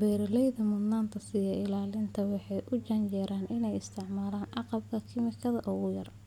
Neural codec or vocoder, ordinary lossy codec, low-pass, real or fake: none; none; 19.8 kHz; real